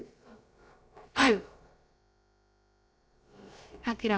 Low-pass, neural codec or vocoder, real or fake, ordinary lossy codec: none; codec, 16 kHz, about 1 kbps, DyCAST, with the encoder's durations; fake; none